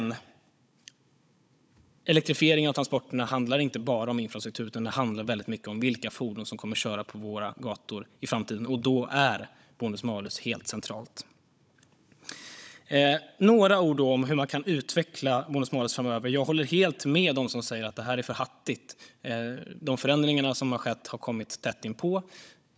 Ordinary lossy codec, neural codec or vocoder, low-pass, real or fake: none; codec, 16 kHz, 16 kbps, FunCodec, trained on Chinese and English, 50 frames a second; none; fake